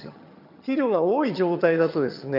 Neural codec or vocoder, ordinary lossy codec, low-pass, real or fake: vocoder, 22.05 kHz, 80 mel bands, HiFi-GAN; none; 5.4 kHz; fake